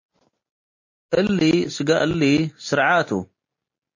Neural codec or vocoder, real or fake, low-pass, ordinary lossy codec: none; real; 7.2 kHz; MP3, 32 kbps